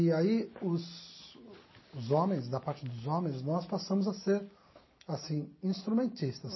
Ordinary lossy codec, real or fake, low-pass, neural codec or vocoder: MP3, 24 kbps; real; 7.2 kHz; none